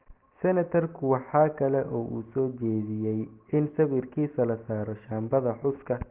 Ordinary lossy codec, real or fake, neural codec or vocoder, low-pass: Opus, 16 kbps; real; none; 3.6 kHz